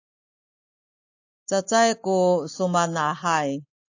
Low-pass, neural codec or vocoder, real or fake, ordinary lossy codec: 7.2 kHz; none; real; AAC, 48 kbps